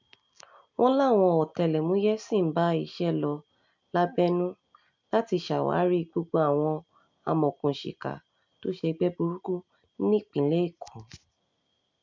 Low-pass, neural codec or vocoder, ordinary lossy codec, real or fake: 7.2 kHz; none; MP3, 64 kbps; real